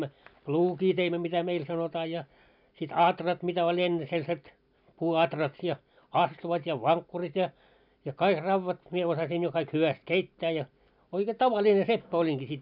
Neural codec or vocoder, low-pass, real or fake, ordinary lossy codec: none; 5.4 kHz; real; none